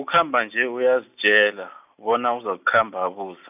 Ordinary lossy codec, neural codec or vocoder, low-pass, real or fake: none; none; 3.6 kHz; real